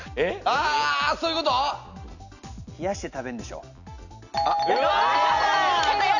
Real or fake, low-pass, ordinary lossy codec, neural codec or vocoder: real; 7.2 kHz; none; none